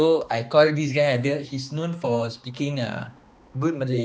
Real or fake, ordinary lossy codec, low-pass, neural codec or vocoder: fake; none; none; codec, 16 kHz, 2 kbps, X-Codec, HuBERT features, trained on balanced general audio